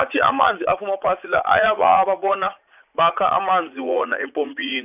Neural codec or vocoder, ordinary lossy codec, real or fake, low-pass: vocoder, 44.1 kHz, 80 mel bands, Vocos; none; fake; 3.6 kHz